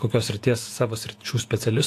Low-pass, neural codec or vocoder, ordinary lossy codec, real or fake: 14.4 kHz; vocoder, 48 kHz, 128 mel bands, Vocos; AAC, 64 kbps; fake